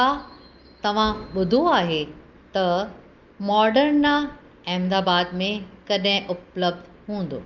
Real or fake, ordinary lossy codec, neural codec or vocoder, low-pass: real; Opus, 24 kbps; none; 7.2 kHz